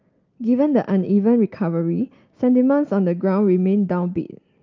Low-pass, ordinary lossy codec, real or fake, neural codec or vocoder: 7.2 kHz; Opus, 24 kbps; real; none